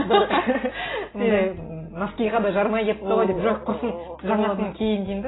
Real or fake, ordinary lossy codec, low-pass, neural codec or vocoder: real; AAC, 16 kbps; 7.2 kHz; none